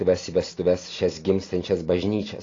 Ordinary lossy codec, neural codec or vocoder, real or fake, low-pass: AAC, 32 kbps; none; real; 7.2 kHz